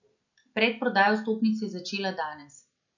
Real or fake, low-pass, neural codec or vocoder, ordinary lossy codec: real; 7.2 kHz; none; none